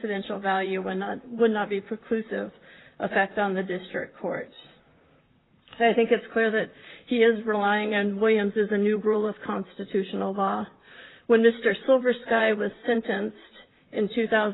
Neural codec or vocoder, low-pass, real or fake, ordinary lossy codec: vocoder, 44.1 kHz, 128 mel bands, Pupu-Vocoder; 7.2 kHz; fake; AAC, 16 kbps